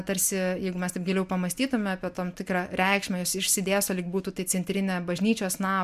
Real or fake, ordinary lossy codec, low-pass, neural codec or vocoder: real; MP3, 96 kbps; 14.4 kHz; none